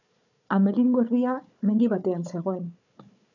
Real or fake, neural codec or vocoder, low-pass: fake; codec, 16 kHz, 4 kbps, FunCodec, trained on Chinese and English, 50 frames a second; 7.2 kHz